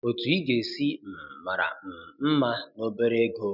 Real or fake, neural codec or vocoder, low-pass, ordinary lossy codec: real; none; 5.4 kHz; none